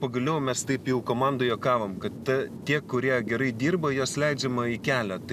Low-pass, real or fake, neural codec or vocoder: 14.4 kHz; real; none